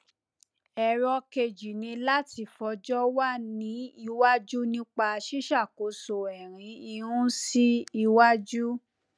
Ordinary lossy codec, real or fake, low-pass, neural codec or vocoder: none; real; none; none